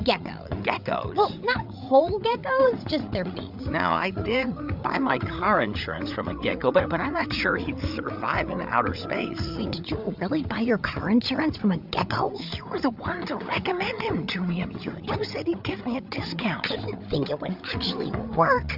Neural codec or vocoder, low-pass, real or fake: codec, 16 kHz, 8 kbps, FreqCodec, larger model; 5.4 kHz; fake